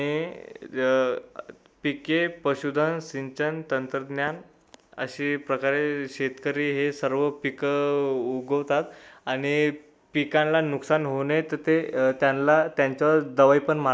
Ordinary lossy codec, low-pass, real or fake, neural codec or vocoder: none; none; real; none